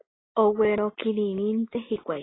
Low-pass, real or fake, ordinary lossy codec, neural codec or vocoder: 7.2 kHz; real; AAC, 16 kbps; none